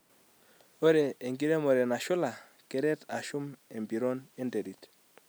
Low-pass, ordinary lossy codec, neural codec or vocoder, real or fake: none; none; none; real